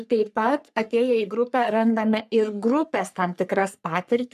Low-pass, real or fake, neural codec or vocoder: 14.4 kHz; fake; codec, 44.1 kHz, 3.4 kbps, Pupu-Codec